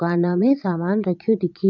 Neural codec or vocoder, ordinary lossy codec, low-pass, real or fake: vocoder, 22.05 kHz, 80 mel bands, Vocos; none; 7.2 kHz; fake